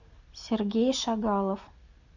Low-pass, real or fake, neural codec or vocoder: 7.2 kHz; real; none